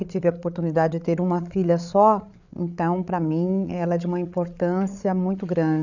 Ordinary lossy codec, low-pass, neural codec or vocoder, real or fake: none; 7.2 kHz; codec, 16 kHz, 8 kbps, FreqCodec, larger model; fake